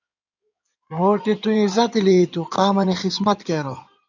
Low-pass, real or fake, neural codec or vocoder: 7.2 kHz; fake; codec, 16 kHz in and 24 kHz out, 2.2 kbps, FireRedTTS-2 codec